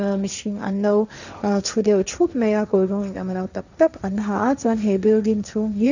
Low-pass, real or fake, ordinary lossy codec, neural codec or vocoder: 7.2 kHz; fake; none; codec, 16 kHz, 1.1 kbps, Voila-Tokenizer